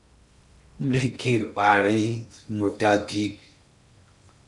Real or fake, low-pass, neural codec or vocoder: fake; 10.8 kHz; codec, 16 kHz in and 24 kHz out, 0.6 kbps, FocalCodec, streaming, 2048 codes